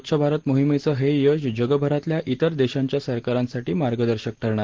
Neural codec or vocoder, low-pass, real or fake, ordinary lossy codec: none; 7.2 kHz; real; Opus, 16 kbps